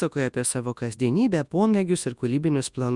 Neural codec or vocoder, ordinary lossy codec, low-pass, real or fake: codec, 24 kHz, 0.9 kbps, WavTokenizer, large speech release; Opus, 64 kbps; 10.8 kHz; fake